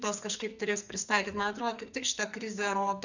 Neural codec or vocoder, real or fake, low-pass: codec, 44.1 kHz, 2.6 kbps, SNAC; fake; 7.2 kHz